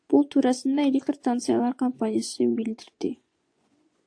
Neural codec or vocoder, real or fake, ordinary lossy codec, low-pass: vocoder, 24 kHz, 100 mel bands, Vocos; fake; AAC, 48 kbps; 9.9 kHz